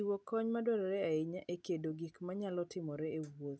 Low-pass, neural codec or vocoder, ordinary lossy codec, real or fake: none; none; none; real